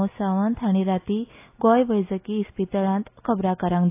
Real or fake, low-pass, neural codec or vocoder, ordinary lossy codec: real; 3.6 kHz; none; AAC, 24 kbps